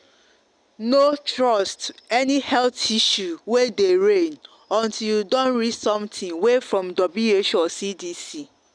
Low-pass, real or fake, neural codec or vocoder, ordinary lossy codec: 9.9 kHz; real; none; none